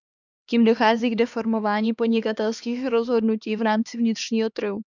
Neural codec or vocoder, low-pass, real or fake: codec, 16 kHz, 2 kbps, X-Codec, HuBERT features, trained on LibriSpeech; 7.2 kHz; fake